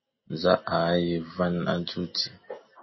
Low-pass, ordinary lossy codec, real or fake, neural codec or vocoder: 7.2 kHz; MP3, 24 kbps; real; none